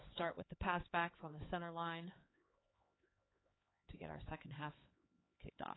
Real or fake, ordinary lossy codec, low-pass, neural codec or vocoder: fake; AAC, 16 kbps; 7.2 kHz; codec, 16 kHz, 4 kbps, X-Codec, HuBERT features, trained on LibriSpeech